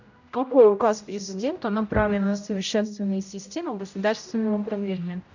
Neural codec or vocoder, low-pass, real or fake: codec, 16 kHz, 0.5 kbps, X-Codec, HuBERT features, trained on general audio; 7.2 kHz; fake